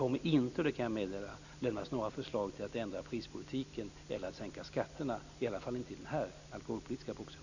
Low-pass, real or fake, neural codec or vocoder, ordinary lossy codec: 7.2 kHz; real; none; none